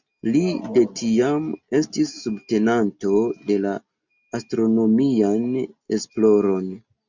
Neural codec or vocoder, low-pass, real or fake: none; 7.2 kHz; real